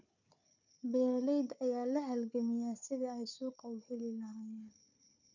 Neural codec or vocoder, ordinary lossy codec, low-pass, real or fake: codec, 16 kHz, 4 kbps, FunCodec, trained on Chinese and English, 50 frames a second; none; 7.2 kHz; fake